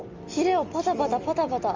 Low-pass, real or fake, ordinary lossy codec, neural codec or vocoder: 7.2 kHz; real; Opus, 32 kbps; none